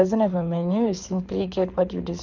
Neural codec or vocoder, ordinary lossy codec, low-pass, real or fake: codec, 24 kHz, 6 kbps, HILCodec; none; 7.2 kHz; fake